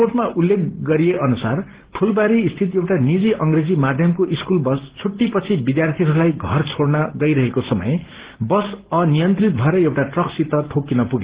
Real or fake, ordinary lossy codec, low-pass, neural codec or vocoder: real; Opus, 16 kbps; 3.6 kHz; none